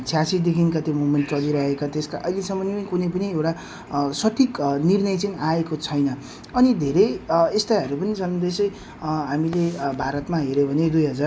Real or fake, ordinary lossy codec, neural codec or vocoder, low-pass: real; none; none; none